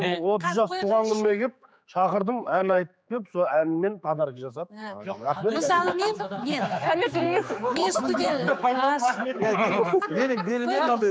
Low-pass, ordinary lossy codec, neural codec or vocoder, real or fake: none; none; codec, 16 kHz, 4 kbps, X-Codec, HuBERT features, trained on general audio; fake